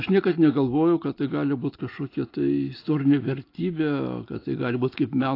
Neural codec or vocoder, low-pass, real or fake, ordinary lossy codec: none; 5.4 kHz; real; AAC, 32 kbps